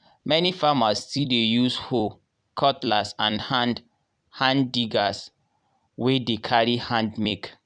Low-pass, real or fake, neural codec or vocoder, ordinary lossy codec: 9.9 kHz; fake; vocoder, 44.1 kHz, 128 mel bands every 256 samples, BigVGAN v2; none